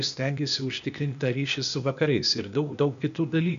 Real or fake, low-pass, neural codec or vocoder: fake; 7.2 kHz; codec, 16 kHz, 0.8 kbps, ZipCodec